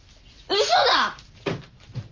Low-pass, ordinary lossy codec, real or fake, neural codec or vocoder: 7.2 kHz; Opus, 32 kbps; real; none